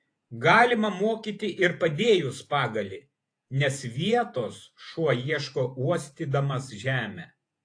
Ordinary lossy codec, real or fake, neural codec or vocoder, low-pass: AAC, 48 kbps; fake; vocoder, 48 kHz, 128 mel bands, Vocos; 9.9 kHz